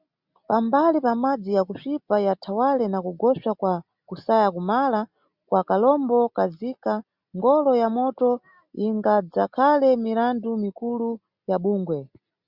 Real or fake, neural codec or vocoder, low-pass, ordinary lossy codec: real; none; 5.4 kHz; Opus, 64 kbps